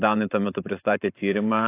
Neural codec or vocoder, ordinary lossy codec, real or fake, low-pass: vocoder, 44.1 kHz, 128 mel bands every 512 samples, BigVGAN v2; AAC, 24 kbps; fake; 3.6 kHz